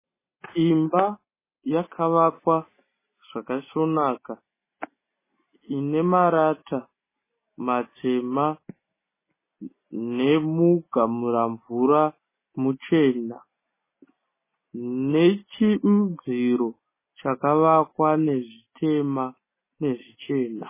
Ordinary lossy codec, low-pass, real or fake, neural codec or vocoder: MP3, 16 kbps; 3.6 kHz; real; none